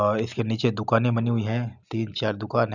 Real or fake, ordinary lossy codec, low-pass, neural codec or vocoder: real; none; 7.2 kHz; none